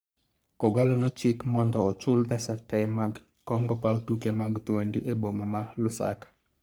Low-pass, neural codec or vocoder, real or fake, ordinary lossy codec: none; codec, 44.1 kHz, 3.4 kbps, Pupu-Codec; fake; none